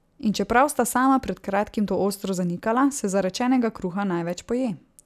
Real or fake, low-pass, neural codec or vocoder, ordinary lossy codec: real; 14.4 kHz; none; none